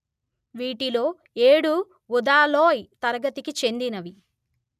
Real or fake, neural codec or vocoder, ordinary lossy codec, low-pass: real; none; none; 14.4 kHz